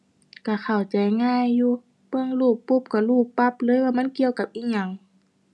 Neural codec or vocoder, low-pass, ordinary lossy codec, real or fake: none; none; none; real